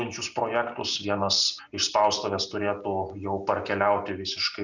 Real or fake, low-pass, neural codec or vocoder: real; 7.2 kHz; none